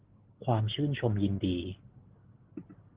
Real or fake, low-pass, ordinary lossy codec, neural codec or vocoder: real; 3.6 kHz; Opus, 16 kbps; none